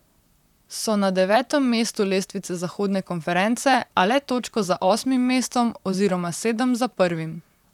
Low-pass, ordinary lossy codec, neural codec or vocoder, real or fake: 19.8 kHz; none; vocoder, 44.1 kHz, 128 mel bands, Pupu-Vocoder; fake